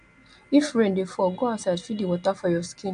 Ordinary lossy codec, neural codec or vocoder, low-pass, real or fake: AAC, 64 kbps; none; 9.9 kHz; real